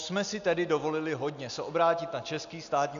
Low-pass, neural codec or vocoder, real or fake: 7.2 kHz; none; real